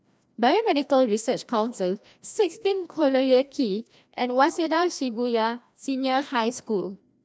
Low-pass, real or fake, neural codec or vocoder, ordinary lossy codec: none; fake; codec, 16 kHz, 1 kbps, FreqCodec, larger model; none